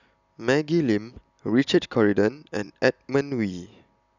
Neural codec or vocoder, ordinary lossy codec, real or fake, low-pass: none; none; real; 7.2 kHz